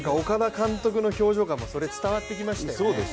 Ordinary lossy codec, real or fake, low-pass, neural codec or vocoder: none; real; none; none